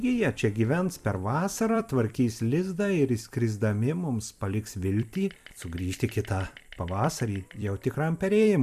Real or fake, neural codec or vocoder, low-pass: fake; vocoder, 44.1 kHz, 128 mel bands every 256 samples, BigVGAN v2; 14.4 kHz